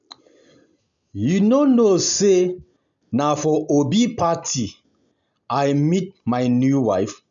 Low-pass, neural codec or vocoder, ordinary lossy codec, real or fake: 7.2 kHz; none; none; real